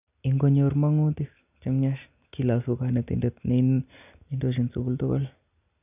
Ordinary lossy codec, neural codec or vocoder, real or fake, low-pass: none; none; real; 3.6 kHz